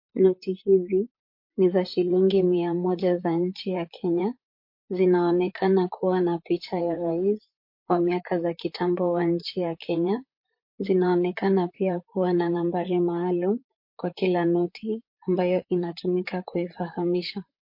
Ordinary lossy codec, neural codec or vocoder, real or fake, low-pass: MP3, 32 kbps; vocoder, 44.1 kHz, 128 mel bands, Pupu-Vocoder; fake; 5.4 kHz